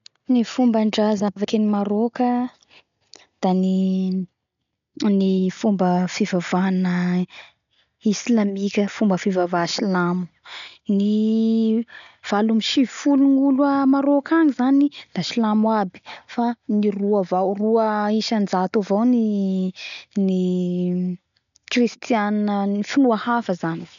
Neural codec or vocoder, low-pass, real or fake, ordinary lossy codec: none; 7.2 kHz; real; MP3, 96 kbps